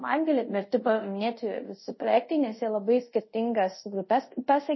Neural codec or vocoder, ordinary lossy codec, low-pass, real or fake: codec, 24 kHz, 0.5 kbps, DualCodec; MP3, 24 kbps; 7.2 kHz; fake